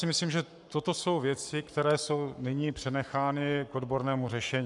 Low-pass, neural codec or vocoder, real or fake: 10.8 kHz; codec, 44.1 kHz, 7.8 kbps, Pupu-Codec; fake